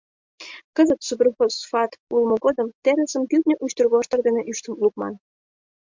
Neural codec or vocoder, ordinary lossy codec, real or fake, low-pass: none; MP3, 64 kbps; real; 7.2 kHz